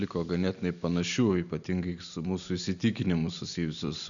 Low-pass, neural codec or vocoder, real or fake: 7.2 kHz; none; real